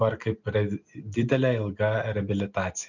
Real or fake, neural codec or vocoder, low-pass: real; none; 7.2 kHz